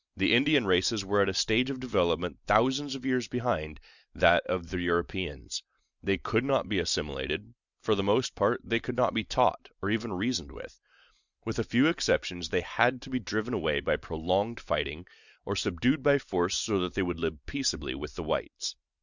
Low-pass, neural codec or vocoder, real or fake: 7.2 kHz; none; real